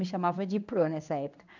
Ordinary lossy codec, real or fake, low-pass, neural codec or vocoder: none; fake; 7.2 kHz; codec, 16 kHz in and 24 kHz out, 1 kbps, XY-Tokenizer